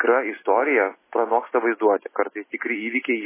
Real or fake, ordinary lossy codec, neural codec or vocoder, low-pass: real; MP3, 16 kbps; none; 3.6 kHz